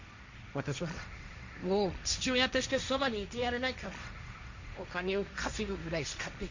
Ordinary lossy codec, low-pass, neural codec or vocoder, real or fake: Opus, 64 kbps; 7.2 kHz; codec, 16 kHz, 1.1 kbps, Voila-Tokenizer; fake